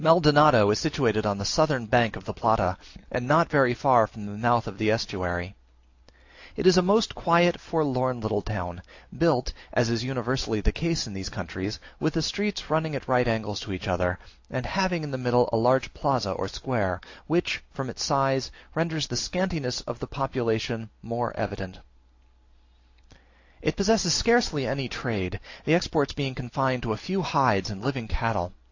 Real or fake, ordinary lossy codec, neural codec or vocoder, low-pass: real; AAC, 48 kbps; none; 7.2 kHz